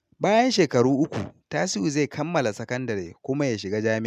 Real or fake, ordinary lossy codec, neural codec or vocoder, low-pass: real; none; none; 14.4 kHz